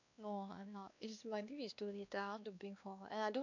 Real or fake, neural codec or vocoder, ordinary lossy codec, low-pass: fake; codec, 16 kHz, 2 kbps, X-Codec, WavLM features, trained on Multilingual LibriSpeech; none; 7.2 kHz